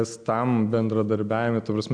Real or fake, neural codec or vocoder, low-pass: real; none; 9.9 kHz